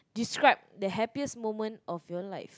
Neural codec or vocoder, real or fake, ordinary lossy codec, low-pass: none; real; none; none